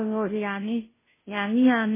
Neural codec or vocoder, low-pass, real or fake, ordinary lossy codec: codec, 16 kHz, 0.5 kbps, FunCodec, trained on Chinese and English, 25 frames a second; 3.6 kHz; fake; MP3, 16 kbps